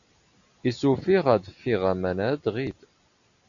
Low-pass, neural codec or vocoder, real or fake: 7.2 kHz; none; real